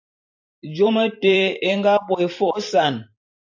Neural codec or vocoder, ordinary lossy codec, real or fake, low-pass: vocoder, 44.1 kHz, 128 mel bands every 512 samples, BigVGAN v2; AAC, 48 kbps; fake; 7.2 kHz